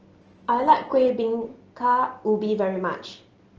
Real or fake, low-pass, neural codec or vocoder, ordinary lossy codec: real; 7.2 kHz; none; Opus, 16 kbps